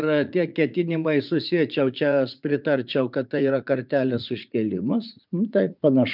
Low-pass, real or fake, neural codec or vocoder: 5.4 kHz; fake; vocoder, 44.1 kHz, 80 mel bands, Vocos